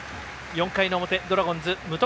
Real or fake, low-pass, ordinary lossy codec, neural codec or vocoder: real; none; none; none